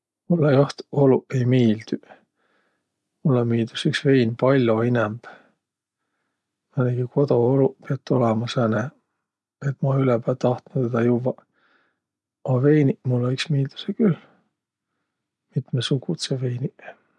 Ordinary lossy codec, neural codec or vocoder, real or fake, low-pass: none; none; real; none